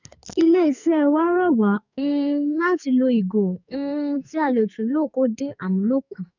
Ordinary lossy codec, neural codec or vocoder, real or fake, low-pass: none; codec, 44.1 kHz, 2.6 kbps, SNAC; fake; 7.2 kHz